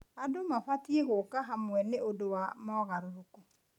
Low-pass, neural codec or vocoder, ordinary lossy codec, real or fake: 19.8 kHz; none; none; real